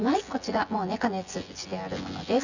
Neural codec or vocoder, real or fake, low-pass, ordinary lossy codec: vocoder, 24 kHz, 100 mel bands, Vocos; fake; 7.2 kHz; none